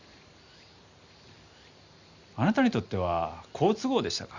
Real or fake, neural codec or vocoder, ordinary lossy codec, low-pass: real; none; Opus, 64 kbps; 7.2 kHz